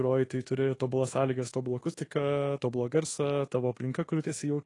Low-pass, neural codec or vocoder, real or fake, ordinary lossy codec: 10.8 kHz; codec, 24 kHz, 1.2 kbps, DualCodec; fake; AAC, 32 kbps